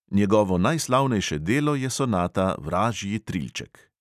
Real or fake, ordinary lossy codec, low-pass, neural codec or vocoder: real; none; 14.4 kHz; none